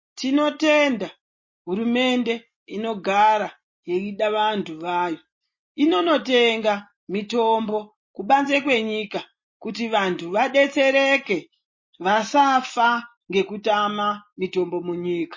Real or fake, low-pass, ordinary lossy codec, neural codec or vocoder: real; 7.2 kHz; MP3, 32 kbps; none